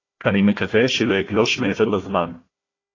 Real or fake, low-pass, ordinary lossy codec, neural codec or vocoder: fake; 7.2 kHz; AAC, 32 kbps; codec, 16 kHz, 1 kbps, FunCodec, trained on Chinese and English, 50 frames a second